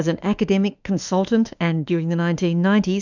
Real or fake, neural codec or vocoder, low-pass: fake; autoencoder, 48 kHz, 32 numbers a frame, DAC-VAE, trained on Japanese speech; 7.2 kHz